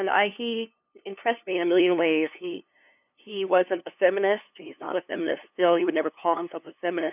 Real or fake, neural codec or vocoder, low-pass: fake; codec, 16 kHz, 2 kbps, FunCodec, trained on LibriTTS, 25 frames a second; 3.6 kHz